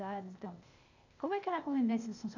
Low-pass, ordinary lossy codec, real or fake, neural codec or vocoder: 7.2 kHz; none; fake; codec, 16 kHz, 0.8 kbps, ZipCodec